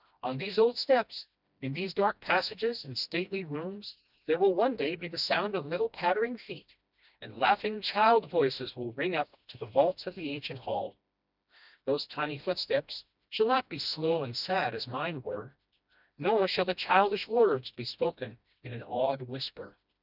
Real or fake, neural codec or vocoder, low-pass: fake; codec, 16 kHz, 1 kbps, FreqCodec, smaller model; 5.4 kHz